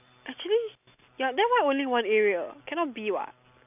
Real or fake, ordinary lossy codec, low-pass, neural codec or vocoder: real; none; 3.6 kHz; none